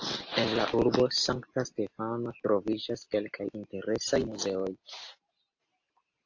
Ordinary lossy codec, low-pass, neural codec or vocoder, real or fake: AAC, 48 kbps; 7.2 kHz; none; real